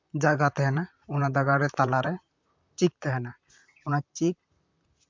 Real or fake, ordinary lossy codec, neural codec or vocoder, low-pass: fake; MP3, 64 kbps; vocoder, 44.1 kHz, 128 mel bands, Pupu-Vocoder; 7.2 kHz